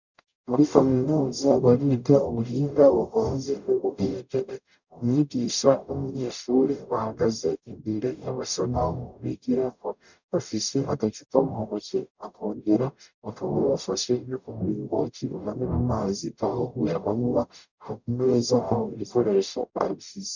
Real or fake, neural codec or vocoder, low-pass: fake; codec, 44.1 kHz, 0.9 kbps, DAC; 7.2 kHz